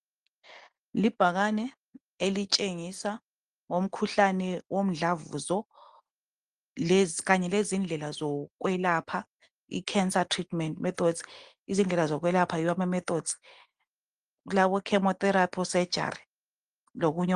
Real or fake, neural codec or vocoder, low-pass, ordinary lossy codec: real; none; 9.9 kHz; Opus, 24 kbps